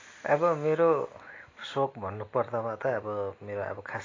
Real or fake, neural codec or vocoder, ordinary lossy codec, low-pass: real; none; AAC, 32 kbps; 7.2 kHz